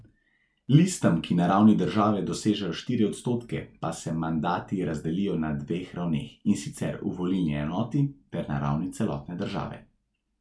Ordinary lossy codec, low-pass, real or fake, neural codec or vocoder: none; none; real; none